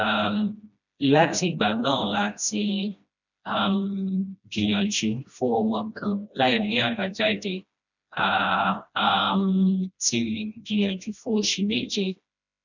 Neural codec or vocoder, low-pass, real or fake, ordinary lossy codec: codec, 16 kHz, 1 kbps, FreqCodec, smaller model; 7.2 kHz; fake; none